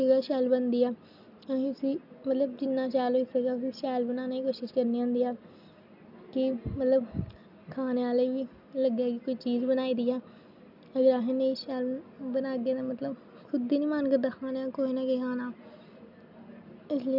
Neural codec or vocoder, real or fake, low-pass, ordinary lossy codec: none; real; 5.4 kHz; none